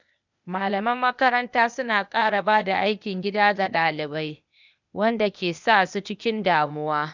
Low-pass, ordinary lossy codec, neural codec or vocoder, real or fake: 7.2 kHz; none; codec, 16 kHz, 0.8 kbps, ZipCodec; fake